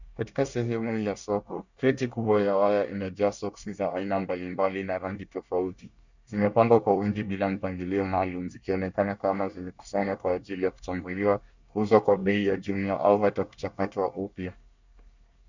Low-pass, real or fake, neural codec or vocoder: 7.2 kHz; fake; codec, 24 kHz, 1 kbps, SNAC